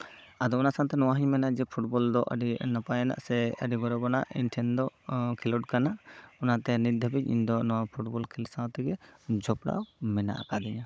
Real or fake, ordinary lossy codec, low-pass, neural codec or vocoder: fake; none; none; codec, 16 kHz, 16 kbps, FunCodec, trained on Chinese and English, 50 frames a second